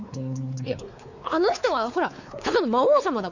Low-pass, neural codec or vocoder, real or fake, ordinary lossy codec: 7.2 kHz; codec, 16 kHz, 4 kbps, X-Codec, WavLM features, trained on Multilingual LibriSpeech; fake; none